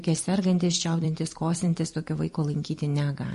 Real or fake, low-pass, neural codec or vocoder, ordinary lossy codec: fake; 14.4 kHz; vocoder, 44.1 kHz, 128 mel bands every 256 samples, BigVGAN v2; MP3, 48 kbps